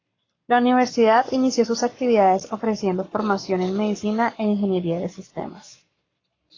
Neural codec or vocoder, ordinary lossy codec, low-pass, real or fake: codec, 44.1 kHz, 7.8 kbps, Pupu-Codec; AAC, 32 kbps; 7.2 kHz; fake